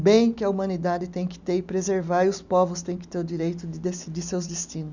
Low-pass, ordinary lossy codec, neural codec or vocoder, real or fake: 7.2 kHz; none; none; real